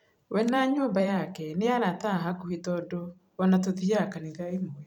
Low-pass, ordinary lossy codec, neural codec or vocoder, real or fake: 19.8 kHz; none; vocoder, 44.1 kHz, 128 mel bands every 512 samples, BigVGAN v2; fake